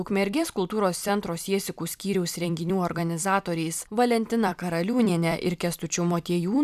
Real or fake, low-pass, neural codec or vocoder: fake; 14.4 kHz; vocoder, 44.1 kHz, 128 mel bands every 256 samples, BigVGAN v2